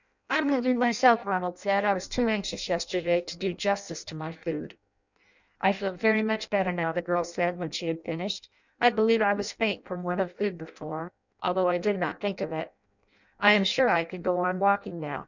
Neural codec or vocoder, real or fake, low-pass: codec, 16 kHz in and 24 kHz out, 0.6 kbps, FireRedTTS-2 codec; fake; 7.2 kHz